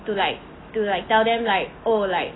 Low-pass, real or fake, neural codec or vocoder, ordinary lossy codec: 7.2 kHz; real; none; AAC, 16 kbps